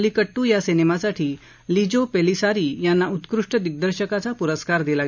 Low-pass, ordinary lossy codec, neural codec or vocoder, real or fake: 7.2 kHz; none; none; real